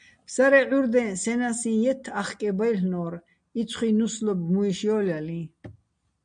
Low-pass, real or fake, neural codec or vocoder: 9.9 kHz; real; none